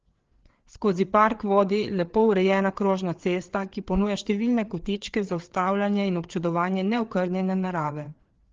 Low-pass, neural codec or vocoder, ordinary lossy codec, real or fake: 7.2 kHz; codec, 16 kHz, 4 kbps, FreqCodec, larger model; Opus, 16 kbps; fake